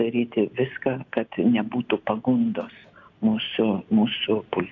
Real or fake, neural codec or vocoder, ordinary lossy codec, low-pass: real; none; AAC, 48 kbps; 7.2 kHz